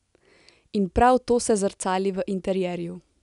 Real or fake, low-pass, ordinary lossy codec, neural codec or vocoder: real; 10.8 kHz; none; none